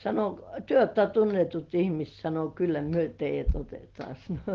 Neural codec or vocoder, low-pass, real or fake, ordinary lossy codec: none; 7.2 kHz; real; Opus, 16 kbps